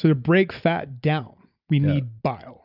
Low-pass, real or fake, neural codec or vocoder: 5.4 kHz; real; none